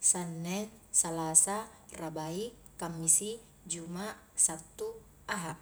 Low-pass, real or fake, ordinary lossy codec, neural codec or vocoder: none; real; none; none